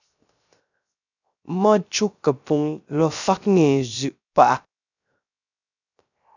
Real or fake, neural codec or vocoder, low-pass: fake; codec, 16 kHz, 0.3 kbps, FocalCodec; 7.2 kHz